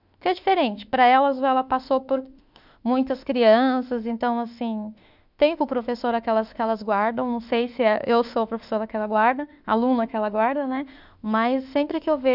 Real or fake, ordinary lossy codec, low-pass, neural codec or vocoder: fake; none; 5.4 kHz; autoencoder, 48 kHz, 32 numbers a frame, DAC-VAE, trained on Japanese speech